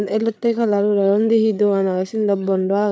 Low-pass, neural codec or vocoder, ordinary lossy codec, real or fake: none; codec, 16 kHz, 8 kbps, FreqCodec, larger model; none; fake